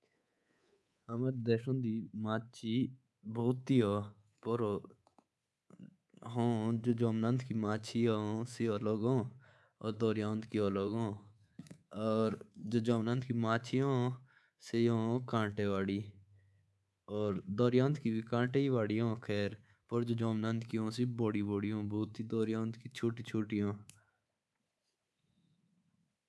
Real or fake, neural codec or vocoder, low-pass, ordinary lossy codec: fake; codec, 24 kHz, 3.1 kbps, DualCodec; none; none